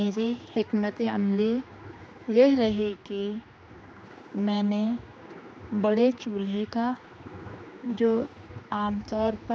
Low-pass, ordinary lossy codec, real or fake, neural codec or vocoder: none; none; fake; codec, 16 kHz, 2 kbps, X-Codec, HuBERT features, trained on general audio